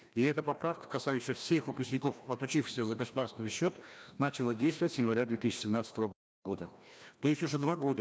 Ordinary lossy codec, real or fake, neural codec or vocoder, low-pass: none; fake; codec, 16 kHz, 1 kbps, FreqCodec, larger model; none